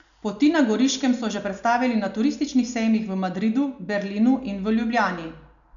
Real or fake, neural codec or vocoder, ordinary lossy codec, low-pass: real; none; none; 7.2 kHz